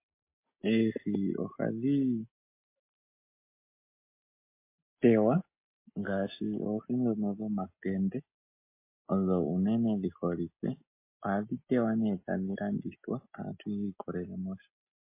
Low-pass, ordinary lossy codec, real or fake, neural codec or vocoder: 3.6 kHz; MP3, 24 kbps; real; none